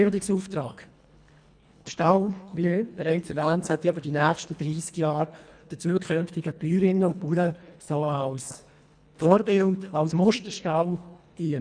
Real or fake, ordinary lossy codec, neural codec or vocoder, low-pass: fake; none; codec, 24 kHz, 1.5 kbps, HILCodec; 9.9 kHz